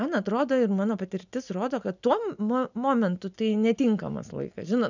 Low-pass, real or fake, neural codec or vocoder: 7.2 kHz; real; none